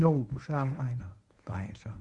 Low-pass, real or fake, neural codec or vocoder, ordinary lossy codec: 10.8 kHz; fake; codec, 24 kHz, 0.9 kbps, WavTokenizer, medium speech release version 1; Opus, 64 kbps